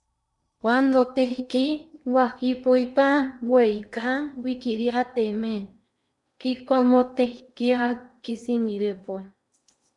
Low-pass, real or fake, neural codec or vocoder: 10.8 kHz; fake; codec, 16 kHz in and 24 kHz out, 0.8 kbps, FocalCodec, streaming, 65536 codes